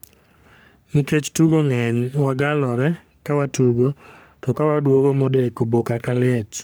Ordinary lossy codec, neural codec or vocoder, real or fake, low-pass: none; codec, 44.1 kHz, 3.4 kbps, Pupu-Codec; fake; none